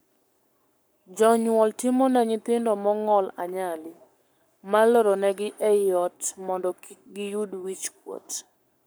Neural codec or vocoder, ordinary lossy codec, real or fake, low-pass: codec, 44.1 kHz, 7.8 kbps, Pupu-Codec; none; fake; none